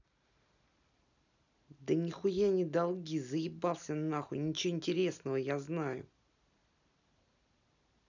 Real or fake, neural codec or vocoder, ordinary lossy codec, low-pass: real; none; none; 7.2 kHz